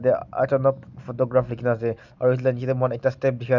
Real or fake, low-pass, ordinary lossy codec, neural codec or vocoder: real; 7.2 kHz; none; none